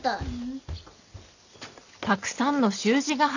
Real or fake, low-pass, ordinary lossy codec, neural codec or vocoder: real; 7.2 kHz; none; none